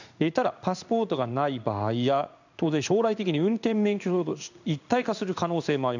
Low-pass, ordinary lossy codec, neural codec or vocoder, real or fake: 7.2 kHz; none; codec, 16 kHz in and 24 kHz out, 1 kbps, XY-Tokenizer; fake